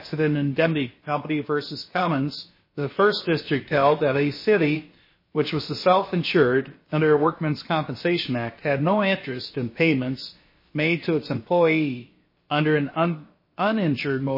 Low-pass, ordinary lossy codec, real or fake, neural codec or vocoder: 5.4 kHz; MP3, 24 kbps; fake; codec, 16 kHz, about 1 kbps, DyCAST, with the encoder's durations